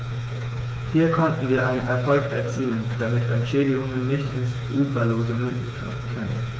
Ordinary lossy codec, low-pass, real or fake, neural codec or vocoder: none; none; fake; codec, 16 kHz, 4 kbps, FreqCodec, smaller model